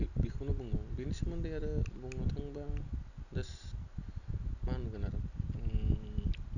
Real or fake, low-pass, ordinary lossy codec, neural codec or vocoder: real; 7.2 kHz; none; none